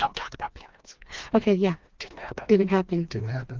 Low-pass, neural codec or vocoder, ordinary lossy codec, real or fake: 7.2 kHz; codec, 16 kHz, 2 kbps, FreqCodec, smaller model; Opus, 32 kbps; fake